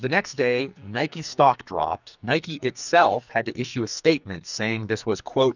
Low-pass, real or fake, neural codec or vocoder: 7.2 kHz; fake; codec, 44.1 kHz, 2.6 kbps, SNAC